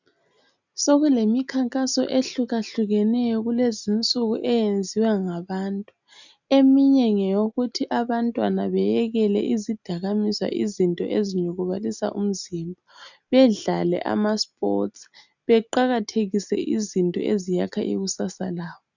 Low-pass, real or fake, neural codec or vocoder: 7.2 kHz; real; none